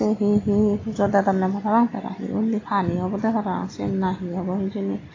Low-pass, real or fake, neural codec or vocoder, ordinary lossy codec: 7.2 kHz; real; none; AAC, 32 kbps